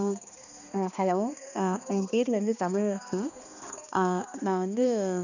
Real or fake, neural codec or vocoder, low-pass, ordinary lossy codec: fake; codec, 16 kHz, 2 kbps, X-Codec, HuBERT features, trained on balanced general audio; 7.2 kHz; none